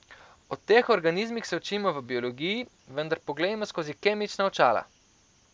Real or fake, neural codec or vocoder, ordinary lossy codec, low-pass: real; none; none; none